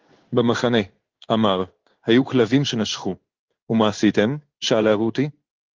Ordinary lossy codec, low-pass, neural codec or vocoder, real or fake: Opus, 32 kbps; 7.2 kHz; codec, 16 kHz in and 24 kHz out, 1 kbps, XY-Tokenizer; fake